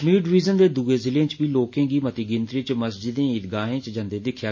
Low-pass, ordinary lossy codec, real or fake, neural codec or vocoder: 7.2 kHz; MP3, 32 kbps; real; none